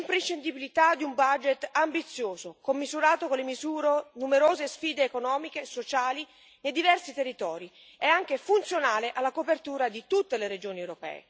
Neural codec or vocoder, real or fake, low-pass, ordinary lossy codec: none; real; none; none